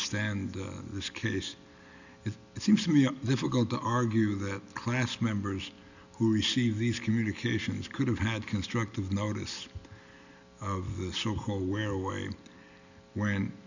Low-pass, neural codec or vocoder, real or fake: 7.2 kHz; none; real